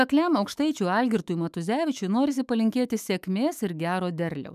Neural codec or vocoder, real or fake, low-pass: autoencoder, 48 kHz, 128 numbers a frame, DAC-VAE, trained on Japanese speech; fake; 14.4 kHz